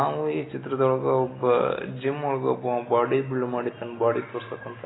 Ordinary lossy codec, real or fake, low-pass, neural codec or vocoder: AAC, 16 kbps; real; 7.2 kHz; none